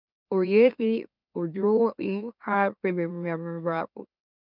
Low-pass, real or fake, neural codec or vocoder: 5.4 kHz; fake; autoencoder, 44.1 kHz, a latent of 192 numbers a frame, MeloTTS